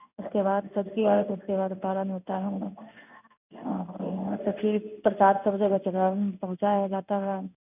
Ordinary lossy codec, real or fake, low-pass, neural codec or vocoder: none; fake; 3.6 kHz; codec, 16 kHz in and 24 kHz out, 1 kbps, XY-Tokenizer